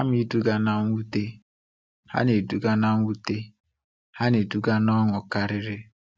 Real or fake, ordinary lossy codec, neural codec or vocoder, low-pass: real; none; none; none